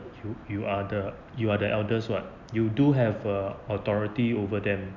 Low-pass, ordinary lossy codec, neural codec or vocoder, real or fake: 7.2 kHz; none; none; real